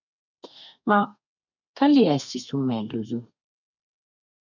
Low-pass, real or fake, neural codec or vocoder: 7.2 kHz; fake; codec, 32 kHz, 1.9 kbps, SNAC